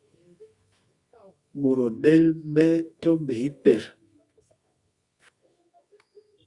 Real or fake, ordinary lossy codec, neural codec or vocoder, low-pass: fake; Opus, 64 kbps; codec, 24 kHz, 0.9 kbps, WavTokenizer, medium music audio release; 10.8 kHz